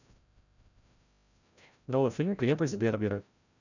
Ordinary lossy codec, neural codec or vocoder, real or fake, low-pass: none; codec, 16 kHz, 0.5 kbps, FreqCodec, larger model; fake; 7.2 kHz